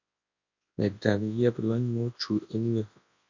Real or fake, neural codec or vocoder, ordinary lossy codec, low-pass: fake; codec, 24 kHz, 0.9 kbps, WavTokenizer, large speech release; MP3, 48 kbps; 7.2 kHz